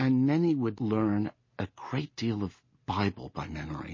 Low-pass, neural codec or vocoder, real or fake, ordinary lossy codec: 7.2 kHz; none; real; MP3, 32 kbps